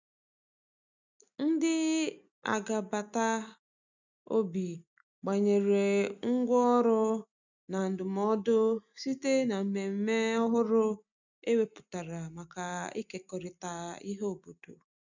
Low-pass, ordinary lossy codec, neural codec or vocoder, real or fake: 7.2 kHz; none; none; real